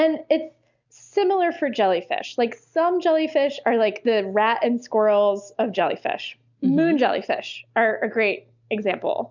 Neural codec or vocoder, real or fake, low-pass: none; real; 7.2 kHz